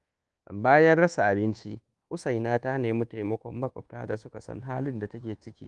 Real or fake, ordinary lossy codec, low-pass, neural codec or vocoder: fake; Opus, 24 kbps; 10.8 kHz; codec, 24 kHz, 1.2 kbps, DualCodec